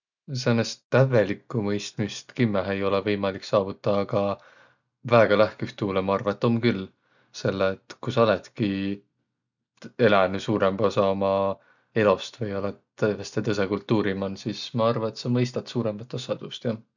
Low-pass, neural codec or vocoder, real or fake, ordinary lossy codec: 7.2 kHz; none; real; none